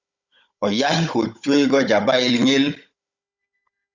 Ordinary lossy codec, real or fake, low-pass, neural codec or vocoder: Opus, 64 kbps; fake; 7.2 kHz; codec, 16 kHz, 16 kbps, FunCodec, trained on Chinese and English, 50 frames a second